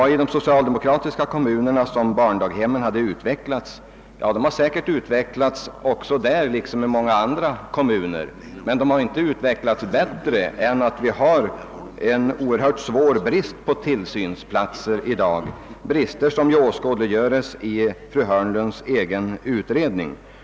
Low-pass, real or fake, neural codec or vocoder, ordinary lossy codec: none; real; none; none